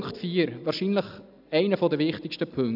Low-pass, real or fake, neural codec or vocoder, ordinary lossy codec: 5.4 kHz; real; none; none